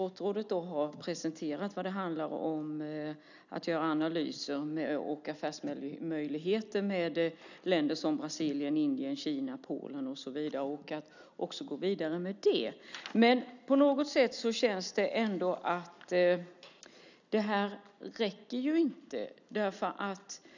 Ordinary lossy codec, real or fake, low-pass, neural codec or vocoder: none; real; 7.2 kHz; none